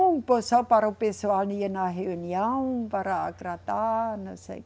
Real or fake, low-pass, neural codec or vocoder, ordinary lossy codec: real; none; none; none